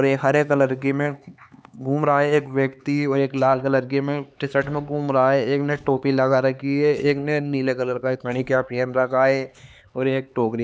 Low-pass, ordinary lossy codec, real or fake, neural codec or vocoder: none; none; fake; codec, 16 kHz, 4 kbps, X-Codec, HuBERT features, trained on LibriSpeech